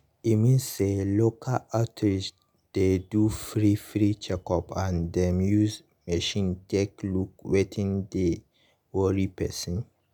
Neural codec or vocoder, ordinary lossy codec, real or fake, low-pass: none; none; real; none